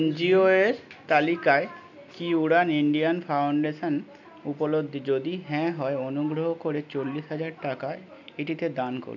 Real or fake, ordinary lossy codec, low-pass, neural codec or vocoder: real; none; 7.2 kHz; none